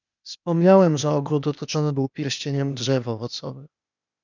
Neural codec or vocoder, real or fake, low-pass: codec, 16 kHz, 0.8 kbps, ZipCodec; fake; 7.2 kHz